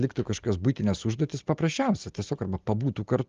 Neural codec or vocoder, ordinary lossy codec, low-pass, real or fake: none; Opus, 32 kbps; 7.2 kHz; real